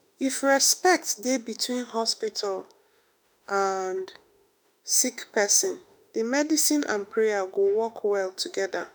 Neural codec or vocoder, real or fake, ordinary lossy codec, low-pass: autoencoder, 48 kHz, 32 numbers a frame, DAC-VAE, trained on Japanese speech; fake; none; none